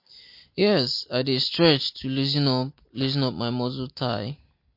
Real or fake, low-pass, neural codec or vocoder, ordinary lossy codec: real; 5.4 kHz; none; MP3, 32 kbps